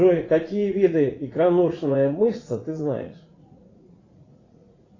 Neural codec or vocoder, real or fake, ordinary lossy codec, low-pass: vocoder, 44.1 kHz, 80 mel bands, Vocos; fake; AAC, 32 kbps; 7.2 kHz